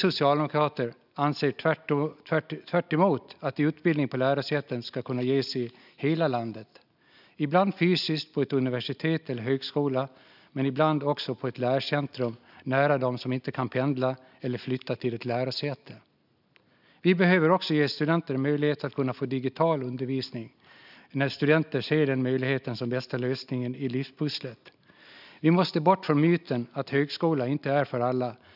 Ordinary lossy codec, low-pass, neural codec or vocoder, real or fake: none; 5.4 kHz; none; real